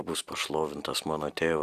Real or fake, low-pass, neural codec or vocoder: real; 14.4 kHz; none